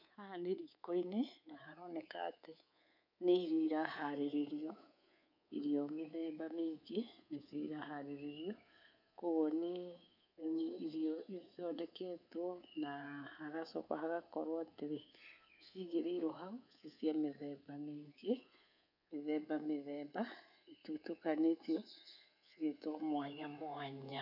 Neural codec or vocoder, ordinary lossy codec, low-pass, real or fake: codec, 24 kHz, 3.1 kbps, DualCodec; none; 5.4 kHz; fake